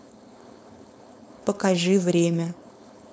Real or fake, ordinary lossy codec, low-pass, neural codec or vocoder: fake; none; none; codec, 16 kHz, 4.8 kbps, FACodec